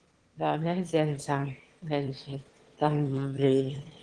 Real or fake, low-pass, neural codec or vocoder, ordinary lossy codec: fake; 9.9 kHz; autoencoder, 22.05 kHz, a latent of 192 numbers a frame, VITS, trained on one speaker; Opus, 16 kbps